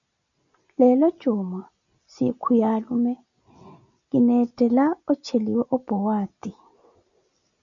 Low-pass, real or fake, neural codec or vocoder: 7.2 kHz; real; none